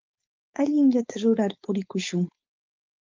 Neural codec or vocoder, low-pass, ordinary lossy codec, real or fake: codec, 16 kHz, 4.8 kbps, FACodec; 7.2 kHz; Opus, 32 kbps; fake